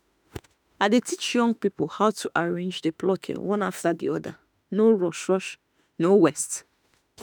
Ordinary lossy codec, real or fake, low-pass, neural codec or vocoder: none; fake; none; autoencoder, 48 kHz, 32 numbers a frame, DAC-VAE, trained on Japanese speech